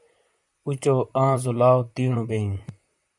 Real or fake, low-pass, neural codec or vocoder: fake; 10.8 kHz; vocoder, 44.1 kHz, 128 mel bands, Pupu-Vocoder